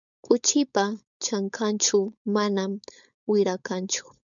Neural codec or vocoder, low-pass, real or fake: codec, 16 kHz, 4.8 kbps, FACodec; 7.2 kHz; fake